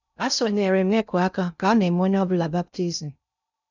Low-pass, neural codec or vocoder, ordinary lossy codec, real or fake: 7.2 kHz; codec, 16 kHz in and 24 kHz out, 0.6 kbps, FocalCodec, streaming, 2048 codes; none; fake